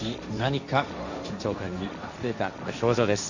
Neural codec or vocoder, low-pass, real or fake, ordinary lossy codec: codec, 16 kHz, 1.1 kbps, Voila-Tokenizer; 7.2 kHz; fake; none